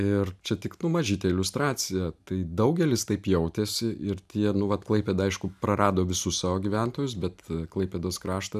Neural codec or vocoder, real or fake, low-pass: none; real; 14.4 kHz